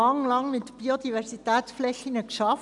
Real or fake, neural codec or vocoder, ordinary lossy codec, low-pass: real; none; none; 10.8 kHz